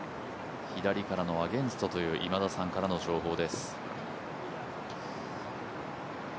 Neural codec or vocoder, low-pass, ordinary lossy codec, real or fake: none; none; none; real